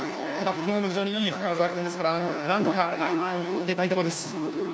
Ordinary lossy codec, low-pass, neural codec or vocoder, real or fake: none; none; codec, 16 kHz, 1 kbps, FunCodec, trained on LibriTTS, 50 frames a second; fake